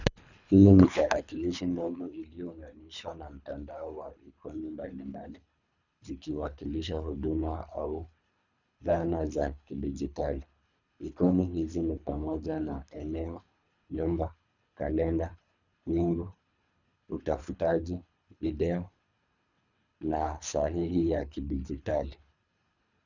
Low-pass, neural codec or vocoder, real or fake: 7.2 kHz; codec, 24 kHz, 3 kbps, HILCodec; fake